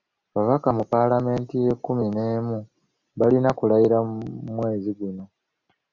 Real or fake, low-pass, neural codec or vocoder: real; 7.2 kHz; none